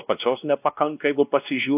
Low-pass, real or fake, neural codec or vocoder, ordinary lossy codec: 3.6 kHz; fake; codec, 16 kHz, 1 kbps, X-Codec, WavLM features, trained on Multilingual LibriSpeech; AAC, 32 kbps